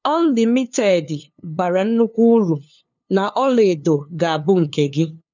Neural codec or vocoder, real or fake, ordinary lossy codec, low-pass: codec, 16 kHz, 2 kbps, FunCodec, trained on LibriTTS, 25 frames a second; fake; none; 7.2 kHz